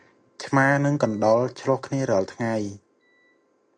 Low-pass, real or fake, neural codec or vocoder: 9.9 kHz; real; none